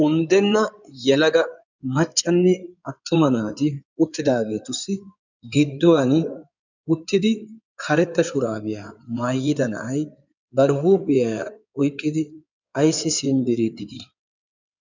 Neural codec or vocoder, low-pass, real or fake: codec, 16 kHz in and 24 kHz out, 2.2 kbps, FireRedTTS-2 codec; 7.2 kHz; fake